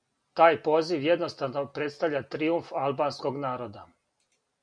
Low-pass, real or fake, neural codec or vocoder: 9.9 kHz; real; none